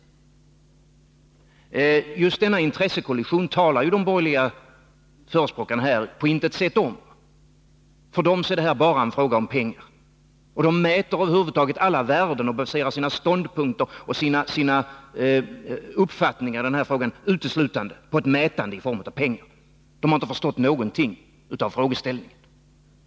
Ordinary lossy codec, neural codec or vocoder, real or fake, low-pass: none; none; real; none